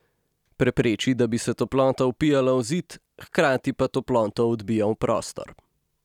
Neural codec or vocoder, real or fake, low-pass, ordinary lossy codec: none; real; 19.8 kHz; none